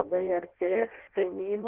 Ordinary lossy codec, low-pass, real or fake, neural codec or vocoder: Opus, 16 kbps; 3.6 kHz; fake; codec, 16 kHz in and 24 kHz out, 0.6 kbps, FireRedTTS-2 codec